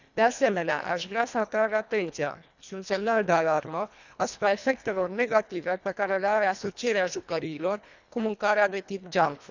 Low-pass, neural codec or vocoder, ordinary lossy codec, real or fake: 7.2 kHz; codec, 24 kHz, 1.5 kbps, HILCodec; none; fake